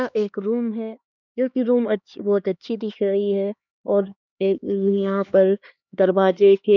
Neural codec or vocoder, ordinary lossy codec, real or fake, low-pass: codec, 16 kHz, 2 kbps, X-Codec, HuBERT features, trained on LibriSpeech; none; fake; 7.2 kHz